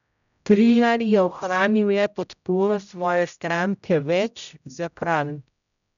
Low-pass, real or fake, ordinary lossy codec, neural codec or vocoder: 7.2 kHz; fake; none; codec, 16 kHz, 0.5 kbps, X-Codec, HuBERT features, trained on general audio